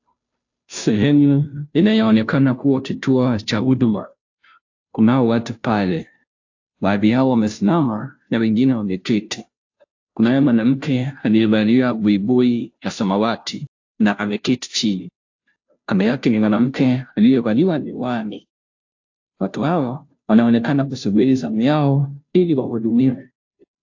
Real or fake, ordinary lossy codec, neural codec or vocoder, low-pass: fake; AAC, 48 kbps; codec, 16 kHz, 0.5 kbps, FunCodec, trained on Chinese and English, 25 frames a second; 7.2 kHz